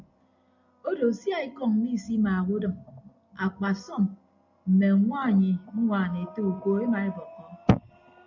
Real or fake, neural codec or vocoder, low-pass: real; none; 7.2 kHz